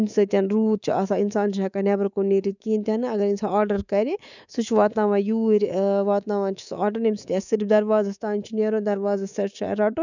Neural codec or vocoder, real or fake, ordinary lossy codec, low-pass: codec, 24 kHz, 3.1 kbps, DualCodec; fake; none; 7.2 kHz